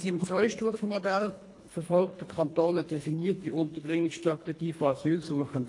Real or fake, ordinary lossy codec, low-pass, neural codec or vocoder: fake; AAC, 48 kbps; 10.8 kHz; codec, 24 kHz, 1.5 kbps, HILCodec